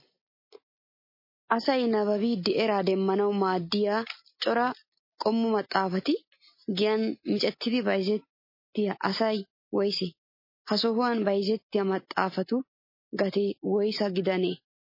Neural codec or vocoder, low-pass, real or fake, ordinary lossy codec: none; 5.4 kHz; real; MP3, 24 kbps